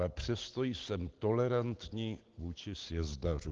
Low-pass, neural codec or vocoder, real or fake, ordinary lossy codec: 7.2 kHz; none; real; Opus, 16 kbps